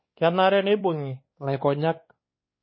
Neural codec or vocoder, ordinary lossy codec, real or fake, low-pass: codec, 16 kHz, 2 kbps, X-Codec, WavLM features, trained on Multilingual LibriSpeech; MP3, 24 kbps; fake; 7.2 kHz